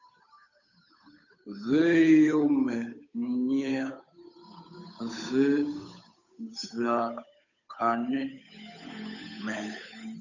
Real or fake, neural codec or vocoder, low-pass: fake; codec, 16 kHz, 8 kbps, FunCodec, trained on Chinese and English, 25 frames a second; 7.2 kHz